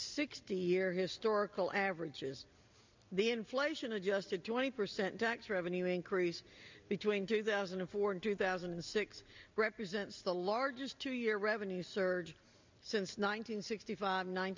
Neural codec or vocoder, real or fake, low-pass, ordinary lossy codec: none; real; 7.2 kHz; MP3, 64 kbps